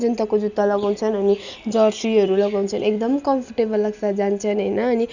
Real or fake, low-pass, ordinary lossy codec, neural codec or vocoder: real; 7.2 kHz; none; none